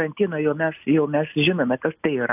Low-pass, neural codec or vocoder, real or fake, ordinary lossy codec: 3.6 kHz; none; real; AAC, 32 kbps